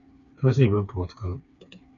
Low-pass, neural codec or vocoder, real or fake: 7.2 kHz; codec, 16 kHz, 4 kbps, FreqCodec, smaller model; fake